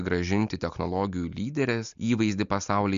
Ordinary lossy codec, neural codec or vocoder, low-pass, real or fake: MP3, 64 kbps; none; 7.2 kHz; real